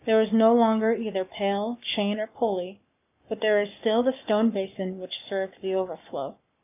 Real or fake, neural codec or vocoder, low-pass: fake; codec, 44.1 kHz, 7.8 kbps, Pupu-Codec; 3.6 kHz